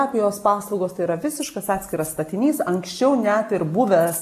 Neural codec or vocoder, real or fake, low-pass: none; real; 14.4 kHz